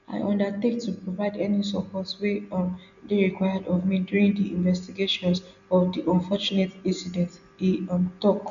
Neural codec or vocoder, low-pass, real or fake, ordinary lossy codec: none; 7.2 kHz; real; none